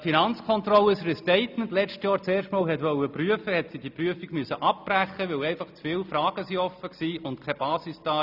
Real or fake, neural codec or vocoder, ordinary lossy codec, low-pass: real; none; none; 5.4 kHz